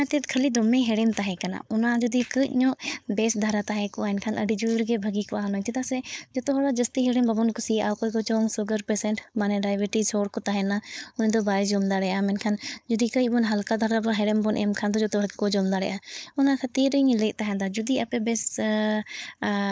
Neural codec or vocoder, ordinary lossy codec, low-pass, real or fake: codec, 16 kHz, 4.8 kbps, FACodec; none; none; fake